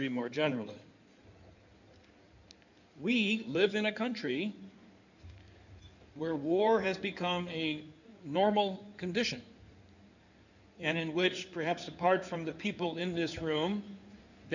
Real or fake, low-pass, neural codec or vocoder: fake; 7.2 kHz; codec, 16 kHz in and 24 kHz out, 2.2 kbps, FireRedTTS-2 codec